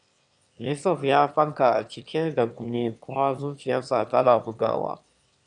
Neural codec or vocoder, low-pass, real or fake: autoencoder, 22.05 kHz, a latent of 192 numbers a frame, VITS, trained on one speaker; 9.9 kHz; fake